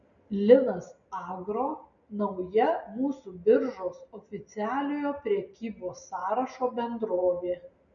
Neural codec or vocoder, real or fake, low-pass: none; real; 7.2 kHz